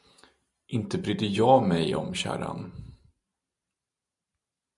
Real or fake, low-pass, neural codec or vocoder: fake; 10.8 kHz; vocoder, 44.1 kHz, 128 mel bands every 512 samples, BigVGAN v2